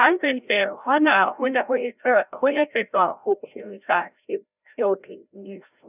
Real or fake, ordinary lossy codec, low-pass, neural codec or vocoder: fake; none; 3.6 kHz; codec, 16 kHz, 0.5 kbps, FreqCodec, larger model